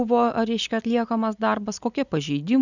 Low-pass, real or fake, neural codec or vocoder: 7.2 kHz; real; none